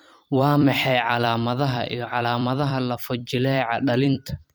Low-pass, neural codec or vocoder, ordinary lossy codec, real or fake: none; vocoder, 44.1 kHz, 128 mel bands every 256 samples, BigVGAN v2; none; fake